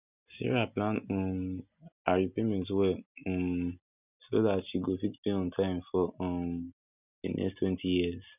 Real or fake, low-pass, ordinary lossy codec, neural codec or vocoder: real; 3.6 kHz; none; none